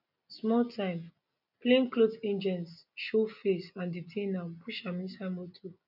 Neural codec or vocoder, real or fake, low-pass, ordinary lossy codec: none; real; 5.4 kHz; none